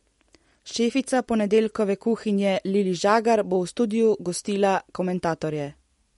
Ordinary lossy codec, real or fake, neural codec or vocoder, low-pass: MP3, 48 kbps; real; none; 19.8 kHz